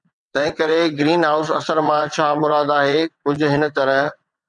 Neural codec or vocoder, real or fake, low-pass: vocoder, 22.05 kHz, 80 mel bands, WaveNeXt; fake; 9.9 kHz